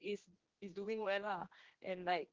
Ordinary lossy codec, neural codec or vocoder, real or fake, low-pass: Opus, 16 kbps; codec, 16 kHz, 1 kbps, X-Codec, HuBERT features, trained on general audio; fake; 7.2 kHz